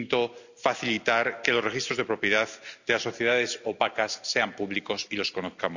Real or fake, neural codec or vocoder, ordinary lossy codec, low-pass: real; none; none; 7.2 kHz